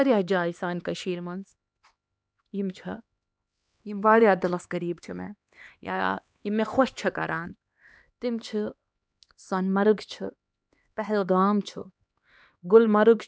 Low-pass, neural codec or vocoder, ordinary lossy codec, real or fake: none; codec, 16 kHz, 2 kbps, X-Codec, HuBERT features, trained on LibriSpeech; none; fake